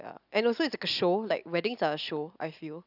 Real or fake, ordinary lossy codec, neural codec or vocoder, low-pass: real; none; none; 5.4 kHz